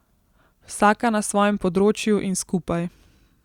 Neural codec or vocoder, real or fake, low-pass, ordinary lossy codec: none; real; 19.8 kHz; Opus, 64 kbps